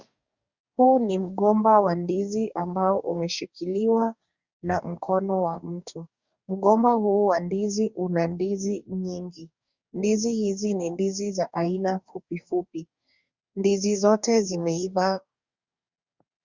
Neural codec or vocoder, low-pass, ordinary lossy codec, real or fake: codec, 44.1 kHz, 2.6 kbps, DAC; 7.2 kHz; Opus, 64 kbps; fake